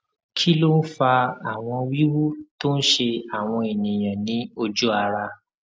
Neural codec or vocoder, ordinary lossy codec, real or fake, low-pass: none; none; real; none